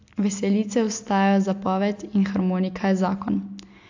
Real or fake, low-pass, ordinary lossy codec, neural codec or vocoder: real; 7.2 kHz; AAC, 48 kbps; none